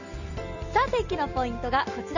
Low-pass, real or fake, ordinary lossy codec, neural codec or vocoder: 7.2 kHz; fake; none; vocoder, 44.1 kHz, 128 mel bands every 256 samples, BigVGAN v2